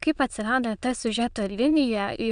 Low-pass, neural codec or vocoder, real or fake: 9.9 kHz; autoencoder, 22.05 kHz, a latent of 192 numbers a frame, VITS, trained on many speakers; fake